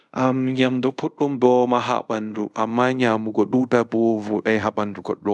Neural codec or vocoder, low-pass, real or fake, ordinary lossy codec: codec, 24 kHz, 0.5 kbps, DualCodec; none; fake; none